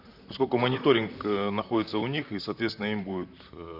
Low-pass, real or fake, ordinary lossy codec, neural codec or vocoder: 5.4 kHz; fake; none; vocoder, 44.1 kHz, 128 mel bands, Pupu-Vocoder